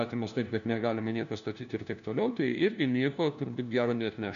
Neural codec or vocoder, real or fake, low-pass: codec, 16 kHz, 1 kbps, FunCodec, trained on LibriTTS, 50 frames a second; fake; 7.2 kHz